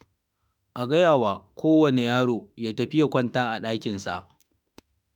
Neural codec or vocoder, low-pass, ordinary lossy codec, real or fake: autoencoder, 48 kHz, 32 numbers a frame, DAC-VAE, trained on Japanese speech; 19.8 kHz; none; fake